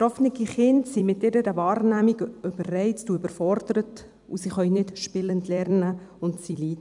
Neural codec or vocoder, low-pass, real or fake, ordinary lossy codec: vocoder, 44.1 kHz, 128 mel bands every 256 samples, BigVGAN v2; 10.8 kHz; fake; none